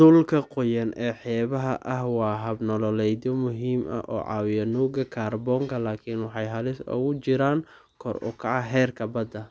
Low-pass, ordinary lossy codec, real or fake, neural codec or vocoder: none; none; real; none